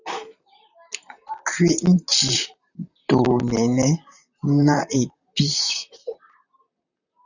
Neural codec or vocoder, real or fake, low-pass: vocoder, 44.1 kHz, 128 mel bands, Pupu-Vocoder; fake; 7.2 kHz